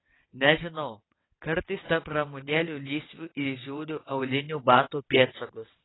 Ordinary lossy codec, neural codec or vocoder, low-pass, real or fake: AAC, 16 kbps; vocoder, 22.05 kHz, 80 mel bands, WaveNeXt; 7.2 kHz; fake